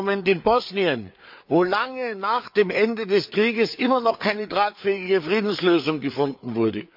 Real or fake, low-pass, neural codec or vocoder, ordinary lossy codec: fake; 5.4 kHz; codec, 16 kHz, 4 kbps, FreqCodec, larger model; MP3, 48 kbps